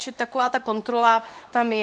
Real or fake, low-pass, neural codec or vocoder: fake; 10.8 kHz; codec, 24 kHz, 0.9 kbps, WavTokenizer, medium speech release version 2